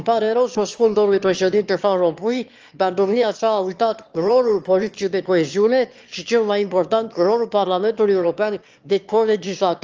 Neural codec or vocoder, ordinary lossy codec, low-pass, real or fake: autoencoder, 22.05 kHz, a latent of 192 numbers a frame, VITS, trained on one speaker; Opus, 24 kbps; 7.2 kHz; fake